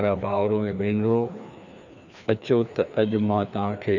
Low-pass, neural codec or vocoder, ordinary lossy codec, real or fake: 7.2 kHz; codec, 16 kHz, 2 kbps, FreqCodec, larger model; none; fake